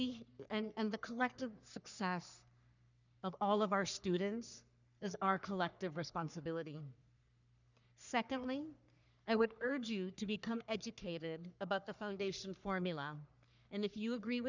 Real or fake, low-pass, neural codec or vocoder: fake; 7.2 kHz; codec, 44.1 kHz, 3.4 kbps, Pupu-Codec